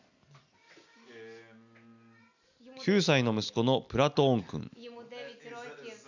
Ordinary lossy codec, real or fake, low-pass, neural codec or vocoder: none; real; 7.2 kHz; none